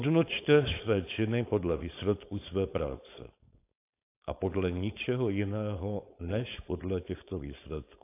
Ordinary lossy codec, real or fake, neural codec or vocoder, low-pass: AAC, 24 kbps; fake; codec, 16 kHz, 4.8 kbps, FACodec; 3.6 kHz